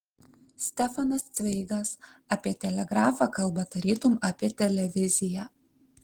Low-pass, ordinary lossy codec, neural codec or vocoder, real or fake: 19.8 kHz; Opus, 16 kbps; none; real